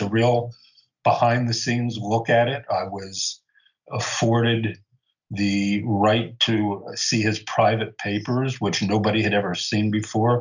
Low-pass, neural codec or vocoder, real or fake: 7.2 kHz; none; real